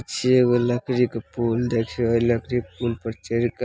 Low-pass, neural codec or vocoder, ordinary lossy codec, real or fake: none; none; none; real